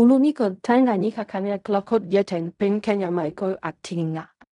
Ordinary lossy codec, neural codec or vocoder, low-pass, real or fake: none; codec, 16 kHz in and 24 kHz out, 0.4 kbps, LongCat-Audio-Codec, fine tuned four codebook decoder; 10.8 kHz; fake